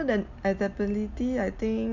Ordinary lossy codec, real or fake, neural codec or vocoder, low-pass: none; real; none; 7.2 kHz